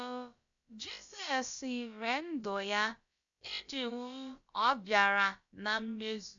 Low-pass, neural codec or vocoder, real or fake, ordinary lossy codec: 7.2 kHz; codec, 16 kHz, about 1 kbps, DyCAST, with the encoder's durations; fake; AAC, 64 kbps